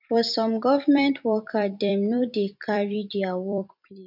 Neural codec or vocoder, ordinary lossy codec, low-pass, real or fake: vocoder, 44.1 kHz, 128 mel bands every 256 samples, BigVGAN v2; none; 5.4 kHz; fake